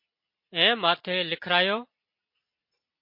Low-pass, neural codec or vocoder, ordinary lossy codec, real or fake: 5.4 kHz; none; MP3, 32 kbps; real